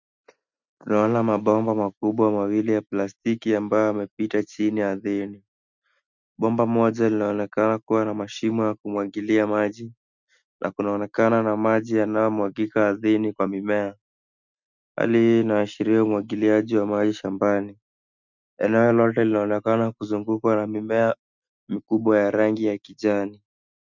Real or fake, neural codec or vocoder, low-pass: real; none; 7.2 kHz